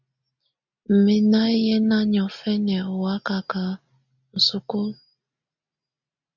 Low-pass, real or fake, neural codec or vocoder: 7.2 kHz; real; none